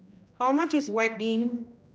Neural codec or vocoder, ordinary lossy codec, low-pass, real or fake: codec, 16 kHz, 1 kbps, X-Codec, HuBERT features, trained on general audio; none; none; fake